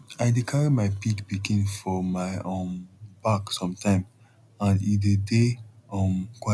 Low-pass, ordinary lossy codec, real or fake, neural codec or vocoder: none; none; real; none